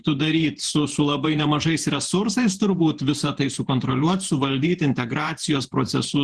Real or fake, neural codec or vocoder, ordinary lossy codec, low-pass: fake; vocoder, 48 kHz, 128 mel bands, Vocos; Opus, 16 kbps; 10.8 kHz